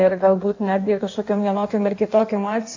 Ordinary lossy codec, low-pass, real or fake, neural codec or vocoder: AAC, 48 kbps; 7.2 kHz; fake; codec, 16 kHz in and 24 kHz out, 1.1 kbps, FireRedTTS-2 codec